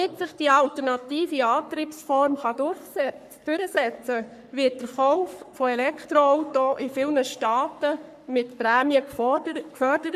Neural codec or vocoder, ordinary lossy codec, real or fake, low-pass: codec, 44.1 kHz, 3.4 kbps, Pupu-Codec; MP3, 96 kbps; fake; 14.4 kHz